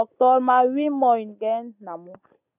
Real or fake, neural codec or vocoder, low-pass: real; none; 3.6 kHz